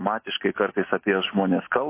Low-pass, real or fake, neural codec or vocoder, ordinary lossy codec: 3.6 kHz; real; none; MP3, 24 kbps